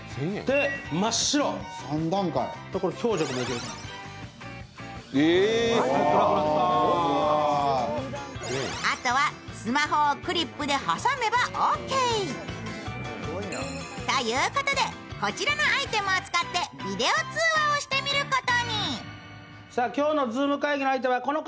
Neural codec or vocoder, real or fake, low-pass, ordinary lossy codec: none; real; none; none